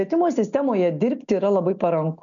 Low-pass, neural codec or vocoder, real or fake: 7.2 kHz; none; real